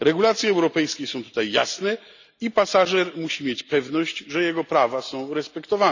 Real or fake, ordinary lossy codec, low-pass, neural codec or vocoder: real; none; 7.2 kHz; none